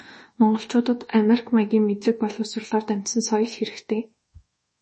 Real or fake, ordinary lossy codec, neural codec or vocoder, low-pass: fake; MP3, 32 kbps; codec, 24 kHz, 1.2 kbps, DualCodec; 10.8 kHz